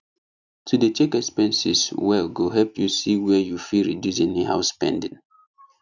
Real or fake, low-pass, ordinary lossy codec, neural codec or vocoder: real; 7.2 kHz; none; none